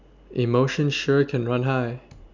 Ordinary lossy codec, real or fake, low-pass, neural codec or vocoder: none; real; 7.2 kHz; none